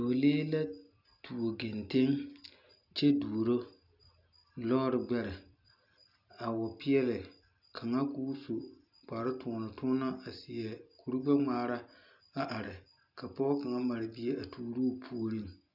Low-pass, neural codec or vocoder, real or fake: 5.4 kHz; none; real